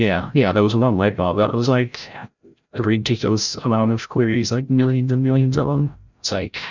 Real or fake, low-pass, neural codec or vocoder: fake; 7.2 kHz; codec, 16 kHz, 0.5 kbps, FreqCodec, larger model